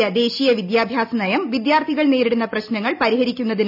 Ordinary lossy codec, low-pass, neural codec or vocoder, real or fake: none; 5.4 kHz; none; real